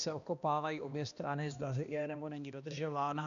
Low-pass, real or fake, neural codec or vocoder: 7.2 kHz; fake; codec, 16 kHz, 1 kbps, X-Codec, HuBERT features, trained on balanced general audio